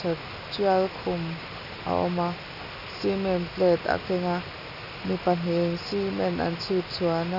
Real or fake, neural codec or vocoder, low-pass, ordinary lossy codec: real; none; 5.4 kHz; none